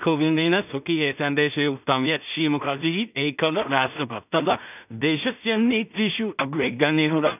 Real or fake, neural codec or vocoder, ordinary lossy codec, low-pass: fake; codec, 16 kHz in and 24 kHz out, 0.4 kbps, LongCat-Audio-Codec, two codebook decoder; none; 3.6 kHz